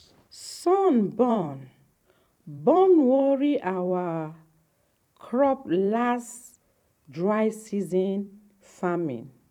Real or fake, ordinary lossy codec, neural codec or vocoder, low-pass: fake; none; vocoder, 44.1 kHz, 128 mel bands every 512 samples, BigVGAN v2; 19.8 kHz